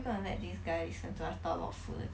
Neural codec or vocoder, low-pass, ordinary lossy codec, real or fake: none; none; none; real